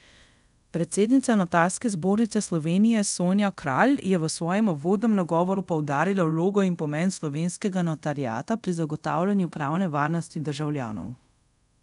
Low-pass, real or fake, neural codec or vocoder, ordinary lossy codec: 10.8 kHz; fake; codec, 24 kHz, 0.5 kbps, DualCodec; none